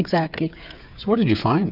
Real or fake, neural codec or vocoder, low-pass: fake; codec, 16 kHz, 8 kbps, FreqCodec, smaller model; 5.4 kHz